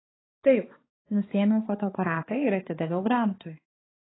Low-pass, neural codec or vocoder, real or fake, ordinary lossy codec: 7.2 kHz; codec, 16 kHz, 4 kbps, X-Codec, HuBERT features, trained on balanced general audio; fake; AAC, 16 kbps